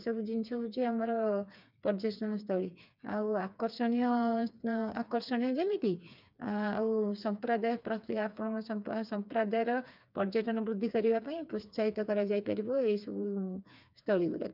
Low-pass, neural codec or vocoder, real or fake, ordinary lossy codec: 5.4 kHz; codec, 16 kHz, 4 kbps, FreqCodec, smaller model; fake; none